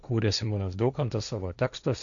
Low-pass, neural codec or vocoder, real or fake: 7.2 kHz; codec, 16 kHz, 1.1 kbps, Voila-Tokenizer; fake